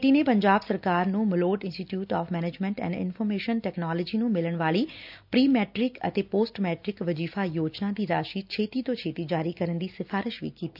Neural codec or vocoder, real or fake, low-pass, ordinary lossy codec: none; real; 5.4 kHz; none